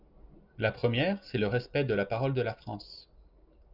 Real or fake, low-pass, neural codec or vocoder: real; 5.4 kHz; none